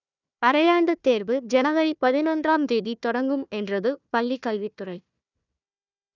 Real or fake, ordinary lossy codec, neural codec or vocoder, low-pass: fake; none; codec, 16 kHz, 1 kbps, FunCodec, trained on Chinese and English, 50 frames a second; 7.2 kHz